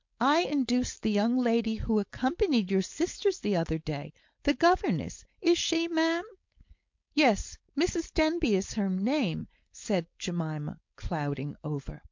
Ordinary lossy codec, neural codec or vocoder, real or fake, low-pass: MP3, 48 kbps; codec, 16 kHz, 4.8 kbps, FACodec; fake; 7.2 kHz